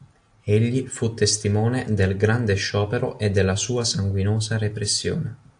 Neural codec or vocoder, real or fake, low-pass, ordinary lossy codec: none; real; 9.9 kHz; AAC, 64 kbps